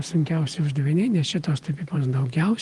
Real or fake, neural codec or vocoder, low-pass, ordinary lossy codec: fake; vocoder, 48 kHz, 128 mel bands, Vocos; 10.8 kHz; Opus, 24 kbps